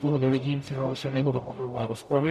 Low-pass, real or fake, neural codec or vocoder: 14.4 kHz; fake; codec, 44.1 kHz, 0.9 kbps, DAC